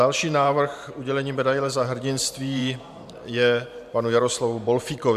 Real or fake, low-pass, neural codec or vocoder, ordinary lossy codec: fake; 14.4 kHz; vocoder, 44.1 kHz, 128 mel bands every 512 samples, BigVGAN v2; MP3, 96 kbps